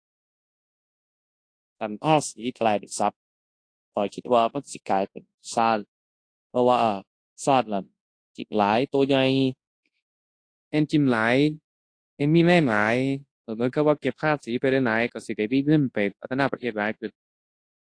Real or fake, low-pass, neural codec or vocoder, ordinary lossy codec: fake; 9.9 kHz; codec, 24 kHz, 0.9 kbps, WavTokenizer, large speech release; AAC, 48 kbps